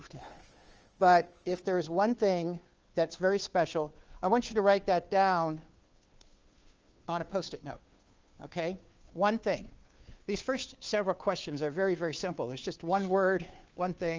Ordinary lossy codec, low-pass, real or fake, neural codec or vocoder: Opus, 24 kbps; 7.2 kHz; fake; codec, 16 kHz, 2 kbps, FunCodec, trained on Chinese and English, 25 frames a second